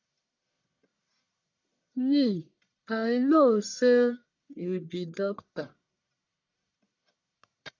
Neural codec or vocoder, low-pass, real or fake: codec, 44.1 kHz, 1.7 kbps, Pupu-Codec; 7.2 kHz; fake